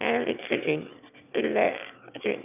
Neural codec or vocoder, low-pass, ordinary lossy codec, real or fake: autoencoder, 22.05 kHz, a latent of 192 numbers a frame, VITS, trained on one speaker; 3.6 kHz; none; fake